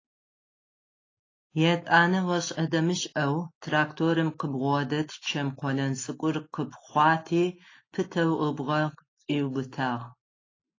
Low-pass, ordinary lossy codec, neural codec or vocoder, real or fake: 7.2 kHz; AAC, 32 kbps; none; real